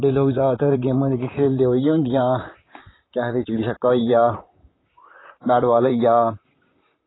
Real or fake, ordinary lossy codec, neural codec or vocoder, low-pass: fake; AAC, 16 kbps; vocoder, 44.1 kHz, 128 mel bands, Pupu-Vocoder; 7.2 kHz